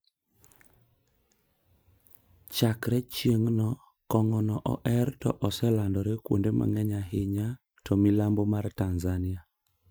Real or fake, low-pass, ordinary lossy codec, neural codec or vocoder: fake; none; none; vocoder, 44.1 kHz, 128 mel bands every 512 samples, BigVGAN v2